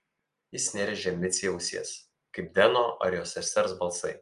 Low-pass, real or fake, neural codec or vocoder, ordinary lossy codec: 10.8 kHz; real; none; Opus, 32 kbps